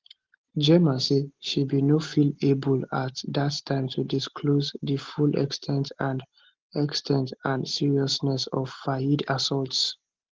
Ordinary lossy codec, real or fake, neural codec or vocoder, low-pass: Opus, 16 kbps; real; none; 7.2 kHz